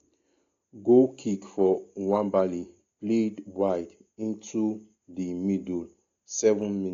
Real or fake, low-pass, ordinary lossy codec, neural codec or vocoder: real; 7.2 kHz; AAC, 48 kbps; none